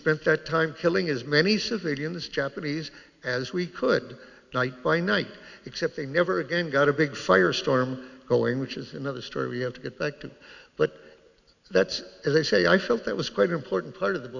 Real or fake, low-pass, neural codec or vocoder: real; 7.2 kHz; none